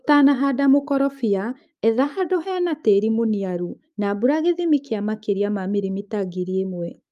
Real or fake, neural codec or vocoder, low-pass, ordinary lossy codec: fake; autoencoder, 48 kHz, 128 numbers a frame, DAC-VAE, trained on Japanese speech; 14.4 kHz; Opus, 32 kbps